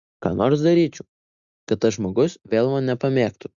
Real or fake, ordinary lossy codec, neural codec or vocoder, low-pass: real; Opus, 64 kbps; none; 7.2 kHz